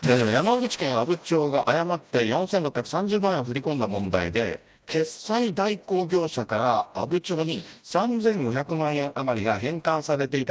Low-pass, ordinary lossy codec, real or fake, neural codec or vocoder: none; none; fake; codec, 16 kHz, 1 kbps, FreqCodec, smaller model